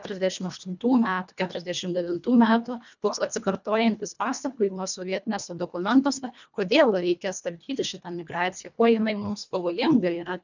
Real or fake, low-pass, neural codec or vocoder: fake; 7.2 kHz; codec, 24 kHz, 1.5 kbps, HILCodec